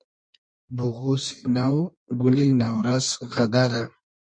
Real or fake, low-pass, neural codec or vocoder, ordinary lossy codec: fake; 9.9 kHz; codec, 16 kHz in and 24 kHz out, 1.1 kbps, FireRedTTS-2 codec; MP3, 48 kbps